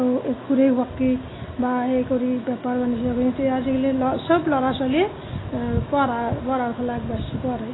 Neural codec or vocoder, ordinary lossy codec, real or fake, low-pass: none; AAC, 16 kbps; real; 7.2 kHz